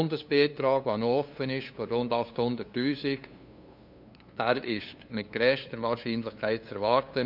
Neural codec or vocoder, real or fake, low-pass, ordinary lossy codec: codec, 16 kHz, 2 kbps, FunCodec, trained on LibriTTS, 25 frames a second; fake; 5.4 kHz; none